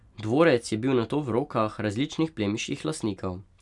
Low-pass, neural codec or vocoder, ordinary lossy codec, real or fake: 10.8 kHz; none; none; real